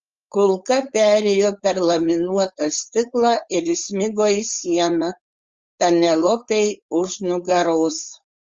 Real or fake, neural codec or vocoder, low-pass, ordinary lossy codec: fake; codec, 16 kHz, 4.8 kbps, FACodec; 7.2 kHz; Opus, 32 kbps